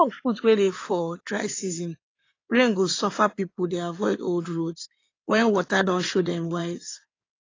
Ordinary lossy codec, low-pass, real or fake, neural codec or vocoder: AAC, 32 kbps; 7.2 kHz; fake; codec, 16 kHz in and 24 kHz out, 2.2 kbps, FireRedTTS-2 codec